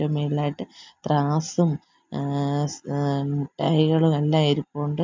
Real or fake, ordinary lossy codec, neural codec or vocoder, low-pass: real; MP3, 64 kbps; none; 7.2 kHz